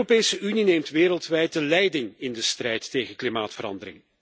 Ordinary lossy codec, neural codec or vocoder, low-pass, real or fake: none; none; none; real